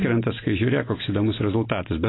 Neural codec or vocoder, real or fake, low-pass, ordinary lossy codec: none; real; 7.2 kHz; AAC, 16 kbps